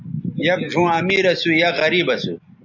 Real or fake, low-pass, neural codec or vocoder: real; 7.2 kHz; none